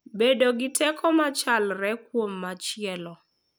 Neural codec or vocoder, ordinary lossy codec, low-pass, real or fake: none; none; none; real